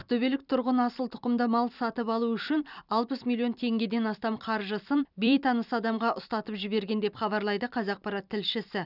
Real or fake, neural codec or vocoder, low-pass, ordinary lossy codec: fake; vocoder, 44.1 kHz, 128 mel bands every 256 samples, BigVGAN v2; 5.4 kHz; none